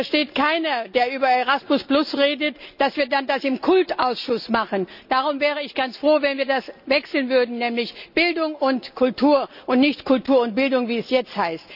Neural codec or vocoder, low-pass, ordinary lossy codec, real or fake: none; 5.4 kHz; none; real